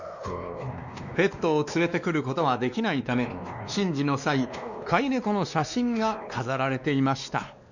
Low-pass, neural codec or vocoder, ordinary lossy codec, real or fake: 7.2 kHz; codec, 16 kHz, 2 kbps, X-Codec, WavLM features, trained on Multilingual LibriSpeech; none; fake